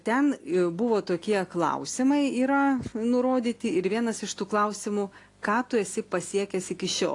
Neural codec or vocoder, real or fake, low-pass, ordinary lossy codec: none; real; 10.8 kHz; AAC, 48 kbps